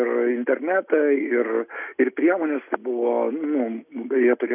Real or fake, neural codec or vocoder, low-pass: real; none; 3.6 kHz